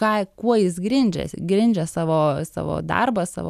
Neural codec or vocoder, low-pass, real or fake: none; 14.4 kHz; real